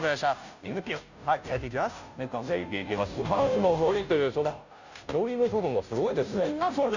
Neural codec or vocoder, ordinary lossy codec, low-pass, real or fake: codec, 16 kHz, 0.5 kbps, FunCodec, trained on Chinese and English, 25 frames a second; none; 7.2 kHz; fake